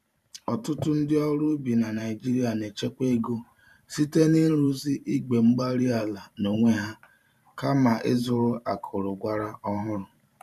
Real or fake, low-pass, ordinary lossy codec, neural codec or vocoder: fake; 14.4 kHz; none; vocoder, 44.1 kHz, 128 mel bands every 512 samples, BigVGAN v2